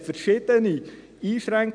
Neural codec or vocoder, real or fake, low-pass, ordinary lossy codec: none; real; 9.9 kHz; none